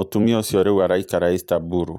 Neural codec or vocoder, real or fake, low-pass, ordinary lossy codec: vocoder, 44.1 kHz, 128 mel bands every 256 samples, BigVGAN v2; fake; none; none